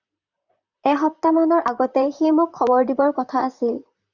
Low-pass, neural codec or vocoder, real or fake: 7.2 kHz; vocoder, 44.1 kHz, 128 mel bands, Pupu-Vocoder; fake